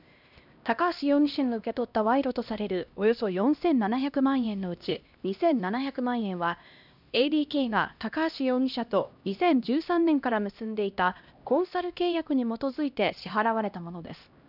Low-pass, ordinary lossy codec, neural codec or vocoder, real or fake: 5.4 kHz; none; codec, 16 kHz, 1 kbps, X-Codec, HuBERT features, trained on LibriSpeech; fake